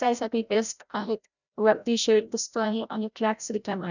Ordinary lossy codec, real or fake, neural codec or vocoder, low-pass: none; fake; codec, 16 kHz, 0.5 kbps, FreqCodec, larger model; 7.2 kHz